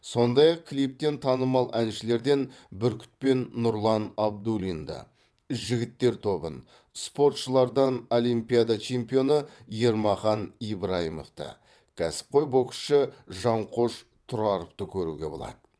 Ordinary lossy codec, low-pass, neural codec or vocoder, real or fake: none; none; vocoder, 22.05 kHz, 80 mel bands, WaveNeXt; fake